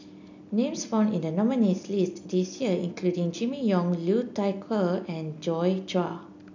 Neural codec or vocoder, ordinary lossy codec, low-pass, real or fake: none; none; 7.2 kHz; real